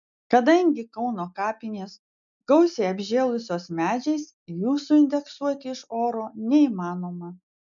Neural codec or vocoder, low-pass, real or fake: none; 7.2 kHz; real